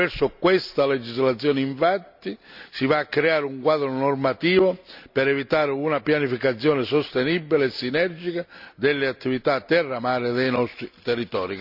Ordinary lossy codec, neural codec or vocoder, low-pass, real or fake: none; none; 5.4 kHz; real